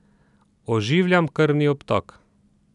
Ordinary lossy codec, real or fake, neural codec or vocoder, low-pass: none; real; none; 10.8 kHz